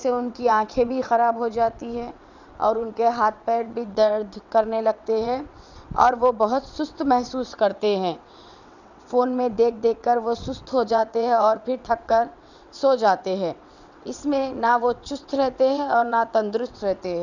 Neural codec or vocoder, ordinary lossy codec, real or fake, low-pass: vocoder, 22.05 kHz, 80 mel bands, WaveNeXt; none; fake; 7.2 kHz